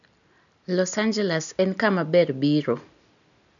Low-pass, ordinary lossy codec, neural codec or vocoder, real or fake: 7.2 kHz; none; none; real